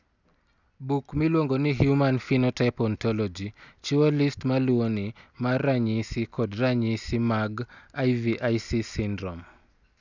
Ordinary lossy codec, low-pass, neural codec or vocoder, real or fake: none; 7.2 kHz; none; real